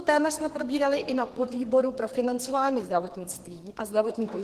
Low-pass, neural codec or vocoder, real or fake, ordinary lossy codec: 14.4 kHz; codec, 32 kHz, 1.9 kbps, SNAC; fake; Opus, 16 kbps